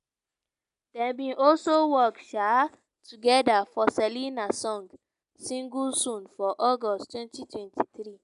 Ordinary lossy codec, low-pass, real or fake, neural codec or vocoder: none; 10.8 kHz; real; none